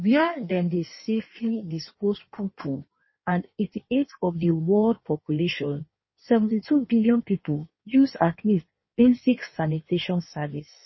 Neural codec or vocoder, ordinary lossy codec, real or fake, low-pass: codec, 16 kHz in and 24 kHz out, 1.1 kbps, FireRedTTS-2 codec; MP3, 24 kbps; fake; 7.2 kHz